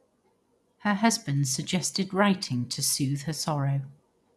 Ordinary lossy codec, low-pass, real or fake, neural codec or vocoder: none; none; fake; vocoder, 24 kHz, 100 mel bands, Vocos